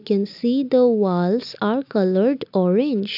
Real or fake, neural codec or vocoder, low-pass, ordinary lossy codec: real; none; 5.4 kHz; AAC, 48 kbps